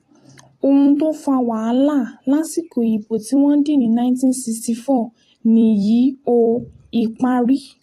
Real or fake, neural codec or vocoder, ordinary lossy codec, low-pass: fake; vocoder, 44.1 kHz, 128 mel bands every 256 samples, BigVGAN v2; AAC, 48 kbps; 14.4 kHz